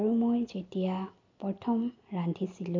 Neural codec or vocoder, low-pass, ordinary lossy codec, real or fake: none; 7.2 kHz; MP3, 48 kbps; real